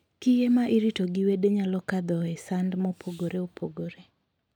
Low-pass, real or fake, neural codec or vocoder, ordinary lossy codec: 19.8 kHz; real; none; none